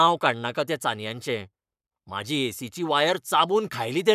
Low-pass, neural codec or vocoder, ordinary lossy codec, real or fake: 14.4 kHz; codec, 44.1 kHz, 7.8 kbps, Pupu-Codec; none; fake